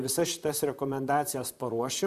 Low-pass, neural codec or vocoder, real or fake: 14.4 kHz; vocoder, 44.1 kHz, 128 mel bands, Pupu-Vocoder; fake